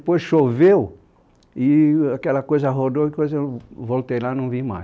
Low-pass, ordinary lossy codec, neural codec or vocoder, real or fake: none; none; none; real